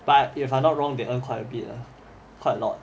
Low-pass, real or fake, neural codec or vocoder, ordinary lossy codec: none; real; none; none